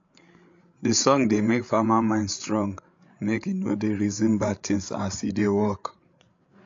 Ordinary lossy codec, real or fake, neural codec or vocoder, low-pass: AAC, 48 kbps; fake; codec, 16 kHz, 8 kbps, FreqCodec, larger model; 7.2 kHz